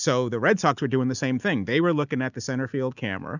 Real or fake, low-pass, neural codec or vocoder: real; 7.2 kHz; none